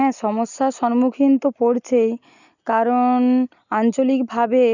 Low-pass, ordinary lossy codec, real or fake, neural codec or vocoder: 7.2 kHz; none; real; none